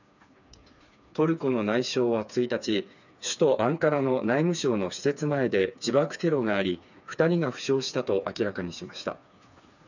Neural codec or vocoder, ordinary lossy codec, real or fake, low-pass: codec, 16 kHz, 4 kbps, FreqCodec, smaller model; none; fake; 7.2 kHz